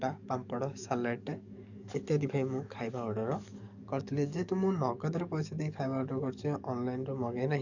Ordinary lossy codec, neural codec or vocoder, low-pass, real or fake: none; codec, 44.1 kHz, 7.8 kbps, DAC; 7.2 kHz; fake